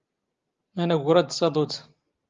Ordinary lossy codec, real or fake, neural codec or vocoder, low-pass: Opus, 32 kbps; real; none; 7.2 kHz